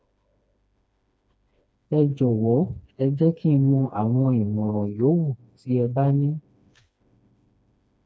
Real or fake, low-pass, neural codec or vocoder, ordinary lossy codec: fake; none; codec, 16 kHz, 2 kbps, FreqCodec, smaller model; none